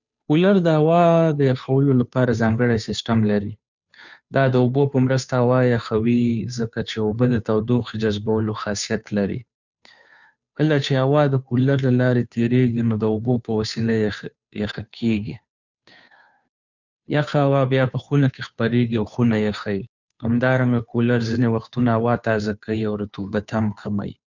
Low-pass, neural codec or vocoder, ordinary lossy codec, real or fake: 7.2 kHz; codec, 16 kHz, 2 kbps, FunCodec, trained on Chinese and English, 25 frames a second; none; fake